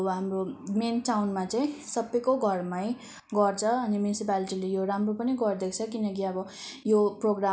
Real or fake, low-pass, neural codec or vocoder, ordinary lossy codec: real; none; none; none